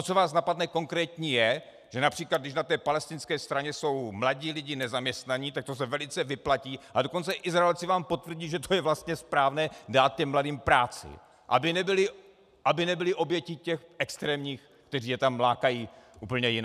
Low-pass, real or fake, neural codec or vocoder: 14.4 kHz; real; none